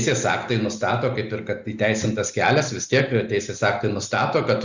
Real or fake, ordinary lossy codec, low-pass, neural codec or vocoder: real; Opus, 64 kbps; 7.2 kHz; none